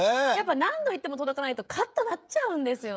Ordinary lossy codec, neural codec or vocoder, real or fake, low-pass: none; codec, 16 kHz, 16 kbps, FreqCodec, smaller model; fake; none